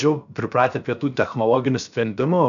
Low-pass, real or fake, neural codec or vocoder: 7.2 kHz; fake; codec, 16 kHz, 0.7 kbps, FocalCodec